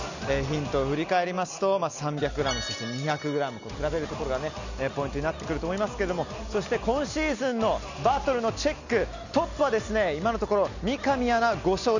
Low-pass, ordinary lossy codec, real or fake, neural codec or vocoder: 7.2 kHz; none; real; none